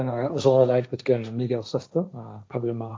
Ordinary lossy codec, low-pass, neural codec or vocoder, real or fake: none; none; codec, 16 kHz, 1.1 kbps, Voila-Tokenizer; fake